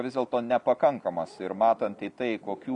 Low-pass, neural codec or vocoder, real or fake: 10.8 kHz; none; real